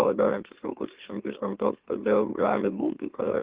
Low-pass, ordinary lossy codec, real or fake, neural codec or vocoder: 3.6 kHz; Opus, 16 kbps; fake; autoencoder, 44.1 kHz, a latent of 192 numbers a frame, MeloTTS